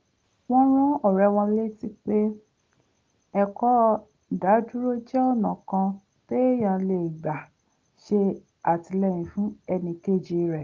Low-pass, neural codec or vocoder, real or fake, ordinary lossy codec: 7.2 kHz; none; real; Opus, 24 kbps